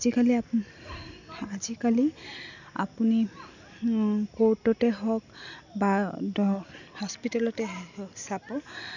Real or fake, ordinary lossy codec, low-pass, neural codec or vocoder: real; none; 7.2 kHz; none